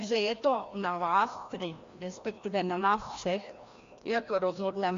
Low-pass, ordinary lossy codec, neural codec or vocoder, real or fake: 7.2 kHz; AAC, 48 kbps; codec, 16 kHz, 1 kbps, FreqCodec, larger model; fake